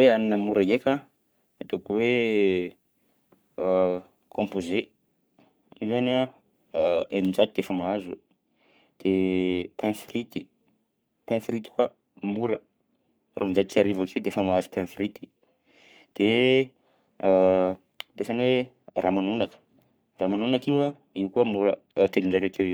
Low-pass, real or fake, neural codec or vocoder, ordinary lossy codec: none; fake; codec, 44.1 kHz, 3.4 kbps, Pupu-Codec; none